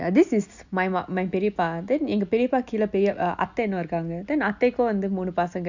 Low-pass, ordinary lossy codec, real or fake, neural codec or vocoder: 7.2 kHz; none; real; none